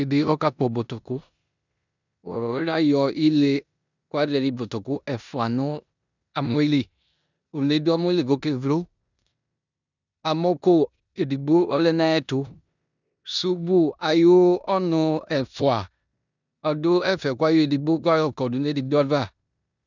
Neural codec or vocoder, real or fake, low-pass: codec, 16 kHz in and 24 kHz out, 0.9 kbps, LongCat-Audio-Codec, four codebook decoder; fake; 7.2 kHz